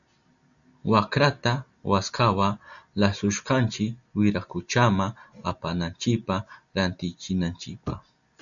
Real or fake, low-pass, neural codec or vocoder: real; 7.2 kHz; none